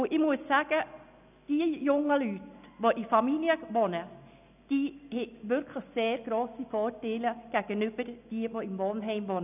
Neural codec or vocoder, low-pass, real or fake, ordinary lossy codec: none; 3.6 kHz; real; none